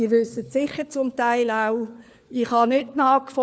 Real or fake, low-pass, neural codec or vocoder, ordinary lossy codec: fake; none; codec, 16 kHz, 4 kbps, FunCodec, trained on LibriTTS, 50 frames a second; none